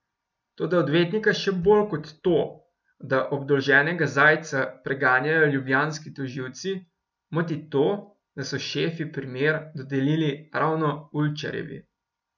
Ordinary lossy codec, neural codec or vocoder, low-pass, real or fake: none; none; 7.2 kHz; real